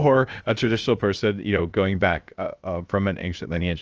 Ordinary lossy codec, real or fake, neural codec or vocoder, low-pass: Opus, 24 kbps; fake; codec, 16 kHz, 0.7 kbps, FocalCodec; 7.2 kHz